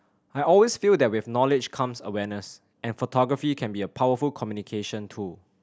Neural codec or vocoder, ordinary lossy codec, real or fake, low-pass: none; none; real; none